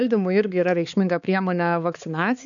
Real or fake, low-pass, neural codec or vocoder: fake; 7.2 kHz; codec, 16 kHz, 4 kbps, X-Codec, HuBERT features, trained on balanced general audio